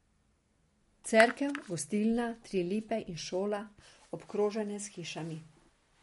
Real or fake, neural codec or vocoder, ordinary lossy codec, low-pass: real; none; MP3, 48 kbps; 19.8 kHz